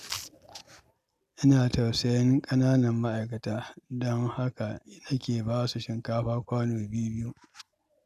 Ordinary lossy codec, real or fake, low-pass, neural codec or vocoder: none; real; 14.4 kHz; none